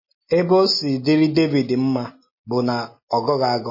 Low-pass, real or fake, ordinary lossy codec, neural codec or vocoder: 5.4 kHz; real; MP3, 24 kbps; none